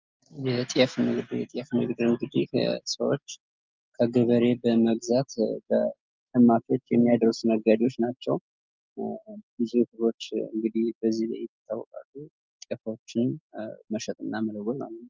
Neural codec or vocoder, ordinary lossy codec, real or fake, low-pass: none; Opus, 24 kbps; real; 7.2 kHz